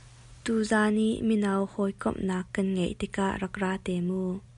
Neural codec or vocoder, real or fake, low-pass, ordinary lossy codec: none; real; 10.8 kHz; MP3, 96 kbps